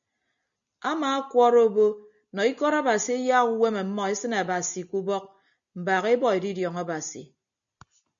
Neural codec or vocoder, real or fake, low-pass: none; real; 7.2 kHz